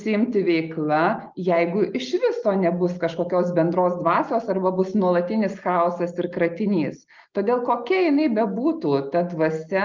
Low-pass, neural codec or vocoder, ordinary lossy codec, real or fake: 7.2 kHz; none; Opus, 32 kbps; real